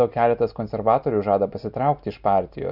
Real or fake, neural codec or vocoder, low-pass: real; none; 5.4 kHz